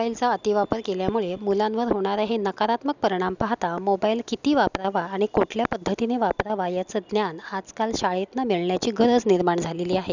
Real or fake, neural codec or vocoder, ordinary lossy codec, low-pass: real; none; none; 7.2 kHz